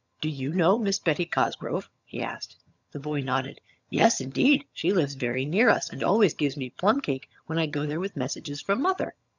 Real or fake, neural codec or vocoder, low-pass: fake; vocoder, 22.05 kHz, 80 mel bands, HiFi-GAN; 7.2 kHz